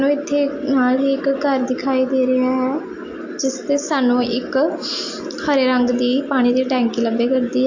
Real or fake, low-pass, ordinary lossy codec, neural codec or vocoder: real; 7.2 kHz; AAC, 48 kbps; none